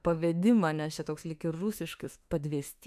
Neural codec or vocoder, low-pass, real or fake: autoencoder, 48 kHz, 32 numbers a frame, DAC-VAE, trained on Japanese speech; 14.4 kHz; fake